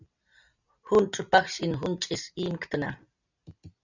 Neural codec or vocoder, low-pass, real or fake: none; 7.2 kHz; real